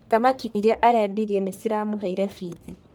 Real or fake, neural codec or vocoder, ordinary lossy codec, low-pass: fake; codec, 44.1 kHz, 1.7 kbps, Pupu-Codec; none; none